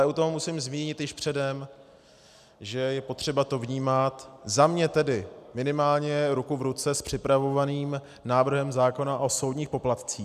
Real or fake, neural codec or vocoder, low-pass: real; none; 14.4 kHz